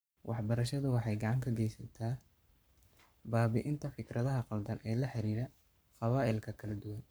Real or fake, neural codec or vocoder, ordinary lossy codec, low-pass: fake; codec, 44.1 kHz, 7.8 kbps, Pupu-Codec; none; none